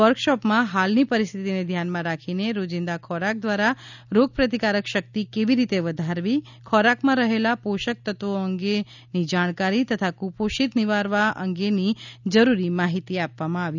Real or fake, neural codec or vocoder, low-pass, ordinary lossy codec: real; none; 7.2 kHz; none